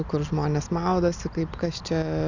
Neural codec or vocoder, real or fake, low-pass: none; real; 7.2 kHz